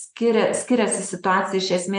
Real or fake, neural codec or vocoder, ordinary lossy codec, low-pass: real; none; AAC, 64 kbps; 9.9 kHz